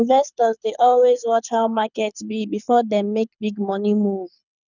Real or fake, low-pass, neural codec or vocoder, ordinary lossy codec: fake; 7.2 kHz; codec, 24 kHz, 6 kbps, HILCodec; none